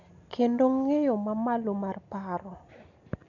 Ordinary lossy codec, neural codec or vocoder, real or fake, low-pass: none; none; real; 7.2 kHz